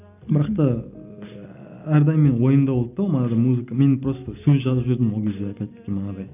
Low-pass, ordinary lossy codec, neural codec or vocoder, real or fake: 3.6 kHz; none; none; real